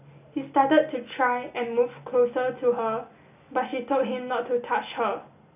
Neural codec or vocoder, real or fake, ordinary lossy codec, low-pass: none; real; none; 3.6 kHz